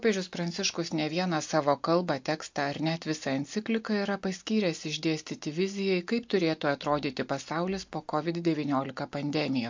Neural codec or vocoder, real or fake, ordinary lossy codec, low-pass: none; real; MP3, 48 kbps; 7.2 kHz